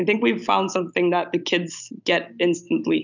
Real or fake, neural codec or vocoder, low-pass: real; none; 7.2 kHz